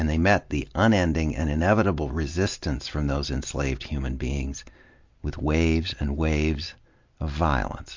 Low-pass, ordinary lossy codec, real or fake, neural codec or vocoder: 7.2 kHz; MP3, 48 kbps; real; none